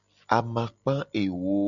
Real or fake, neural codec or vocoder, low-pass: real; none; 7.2 kHz